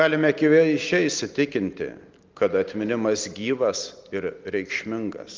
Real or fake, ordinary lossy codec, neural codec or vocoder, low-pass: real; Opus, 32 kbps; none; 7.2 kHz